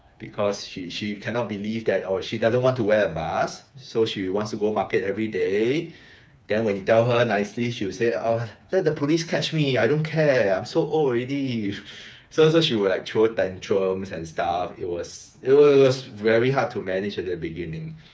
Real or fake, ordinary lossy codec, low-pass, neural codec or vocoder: fake; none; none; codec, 16 kHz, 4 kbps, FreqCodec, smaller model